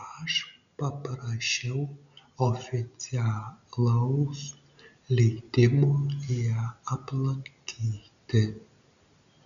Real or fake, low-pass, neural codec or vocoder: real; 7.2 kHz; none